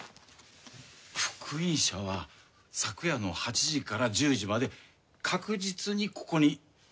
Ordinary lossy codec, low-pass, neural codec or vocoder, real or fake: none; none; none; real